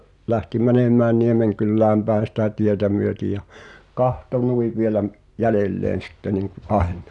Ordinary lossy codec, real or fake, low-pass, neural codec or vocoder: none; real; 10.8 kHz; none